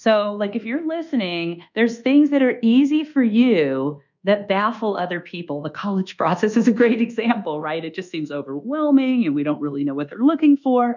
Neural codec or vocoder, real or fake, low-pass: codec, 24 kHz, 1.2 kbps, DualCodec; fake; 7.2 kHz